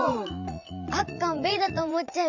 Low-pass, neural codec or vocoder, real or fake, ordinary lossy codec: 7.2 kHz; vocoder, 44.1 kHz, 80 mel bands, Vocos; fake; none